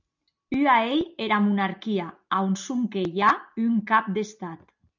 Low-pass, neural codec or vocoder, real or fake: 7.2 kHz; none; real